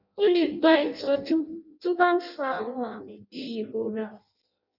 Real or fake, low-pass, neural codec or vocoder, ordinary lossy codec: fake; 5.4 kHz; codec, 16 kHz in and 24 kHz out, 0.6 kbps, FireRedTTS-2 codec; none